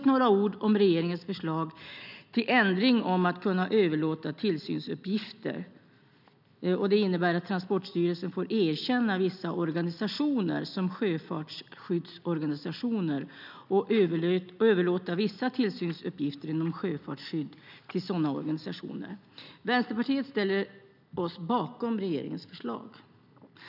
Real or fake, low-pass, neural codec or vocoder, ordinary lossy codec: real; 5.4 kHz; none; none